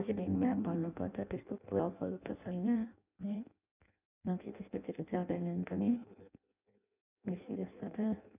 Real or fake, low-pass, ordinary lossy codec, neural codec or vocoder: fake; 3.6 kHz; none; codec, 16 kHz in and 24 kHz out, 0.6 kbps, FireRedTTS-2 codec